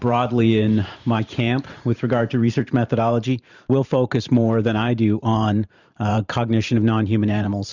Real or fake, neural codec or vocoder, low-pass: fake; vocoder, 44.1 kHz, 128 mel bands every 512 samples, BigVGAN v2; 7.2 kHz